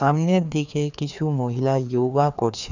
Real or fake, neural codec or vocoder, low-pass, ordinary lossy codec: fake; codec, 16 kHz, 2 kbps, FreqCodec, larger model; 7.2 kHz; none